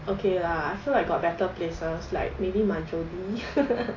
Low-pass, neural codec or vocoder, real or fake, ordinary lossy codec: 7.2 kHz; none; real; AAC, 32 kbps